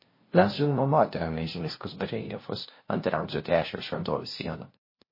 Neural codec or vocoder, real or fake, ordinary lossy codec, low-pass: codec, 16 kHz, 0.5 kbps, FunCodec, trained on LibriTTS, 25 frames a second; fake; MP3, 24 kbps; 5.4 kHz